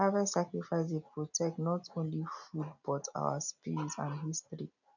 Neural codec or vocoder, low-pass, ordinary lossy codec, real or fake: none; 7.2 kHz; none; real